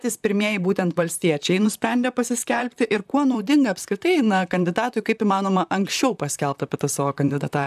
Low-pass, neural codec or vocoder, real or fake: 14.4 kHz; vocoder, 44.1 kHz, 128 mel bands, Pupu-Vocoder; fake